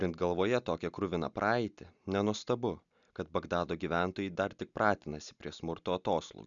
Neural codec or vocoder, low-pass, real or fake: none; 7.2 kHz; real